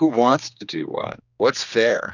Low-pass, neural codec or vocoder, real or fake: 7.2 kHz; codec, 16 kHz, 2 kbps, X-Codec, HuBERT features, trained on general audio; fake